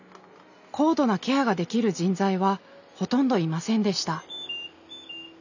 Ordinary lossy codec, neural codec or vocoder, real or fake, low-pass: none; none; real; 7.2 kHz